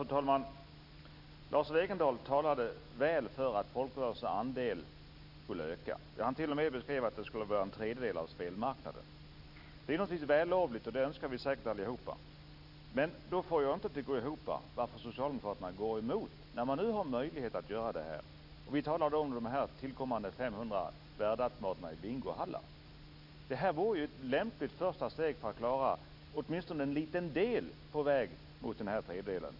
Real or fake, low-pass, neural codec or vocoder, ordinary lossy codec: real; 5.4 kHz; none; none